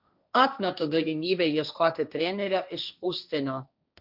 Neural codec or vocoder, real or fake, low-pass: codec, 16 kHz, 1.1 kbps, Voila-Tokenizer; fake; 5.4 kHz